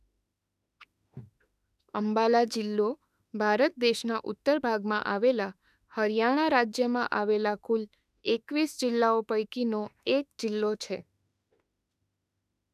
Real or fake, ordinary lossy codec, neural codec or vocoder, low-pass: fake; none; autoencoder, 48 kHz, 32 numbers a frame, DAC-VAE, trained on Japanese speech; 14.4 kHz